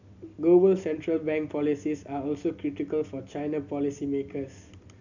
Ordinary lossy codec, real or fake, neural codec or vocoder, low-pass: none; real; none; 7.2 kHz